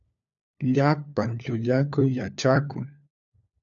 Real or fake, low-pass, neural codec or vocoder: fake; 7.2 kHz; codec, 16 kHz, 4 kbps, FunCodec, trained on LibriTTS, 50 frames a second